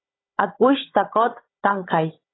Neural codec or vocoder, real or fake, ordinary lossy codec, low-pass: codec, 16 kHz, 16 kbps, FunCodec, trained on Chinese and English, 50 frames a second; fake; AAC, 16 kbps; 7.2 kHz